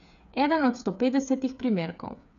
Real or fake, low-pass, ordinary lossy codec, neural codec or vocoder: fake; 7.2 kHz; none; codec, 16 kHz, 8 kbps, FreqCodec, smaller model